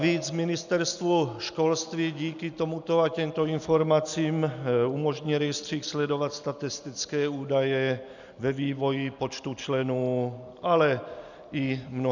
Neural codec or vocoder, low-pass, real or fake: none; 7.2 kHz; real